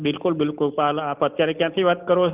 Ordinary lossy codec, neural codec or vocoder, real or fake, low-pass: Opus, 32 kbps; none; real; 3.6 kHz